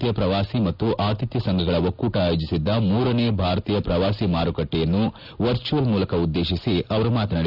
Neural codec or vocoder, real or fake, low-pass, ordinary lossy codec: none; real; 5.4 kHz; none